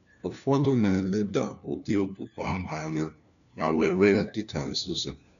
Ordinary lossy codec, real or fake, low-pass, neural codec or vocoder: none; fake; 7.2 kHz; codec, 16 kHz, 1 kbps, FunCodec, trained on LibriTTS, 50 frames a second